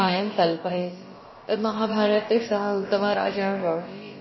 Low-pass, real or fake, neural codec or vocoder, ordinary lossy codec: 7.2 kHz; fake; codec, 16 kHz, about 1 kbps, DyCAST, with the encoder's durations; MP3, 24 kbps